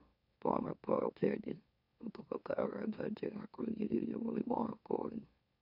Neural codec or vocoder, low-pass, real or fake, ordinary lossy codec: autoencoder, 44.1 kHz, a latent of 192 numbers a frame, MeloTTS; 5.4 kHz; fake; none